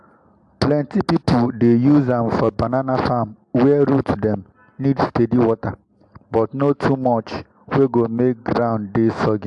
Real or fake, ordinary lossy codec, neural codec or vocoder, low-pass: real; AAC, 64 kbps; none; 10.8 kHz